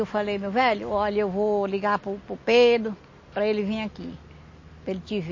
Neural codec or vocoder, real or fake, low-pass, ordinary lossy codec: none; real; 7.2 kHz; MP3, 32 kbps